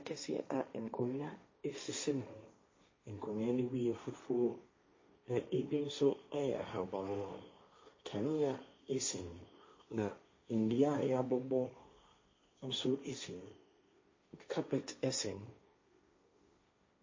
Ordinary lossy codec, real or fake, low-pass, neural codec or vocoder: MP3, 32 kbps; fake; 7.2 kHz; codec, 16 kHz, 1.1 kbps, Voila-Tokenizer